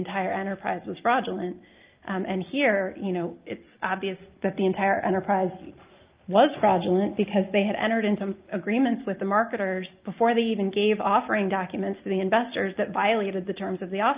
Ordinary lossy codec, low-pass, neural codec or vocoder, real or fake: Opus, 24 kbps; 3.6 kHz; none; real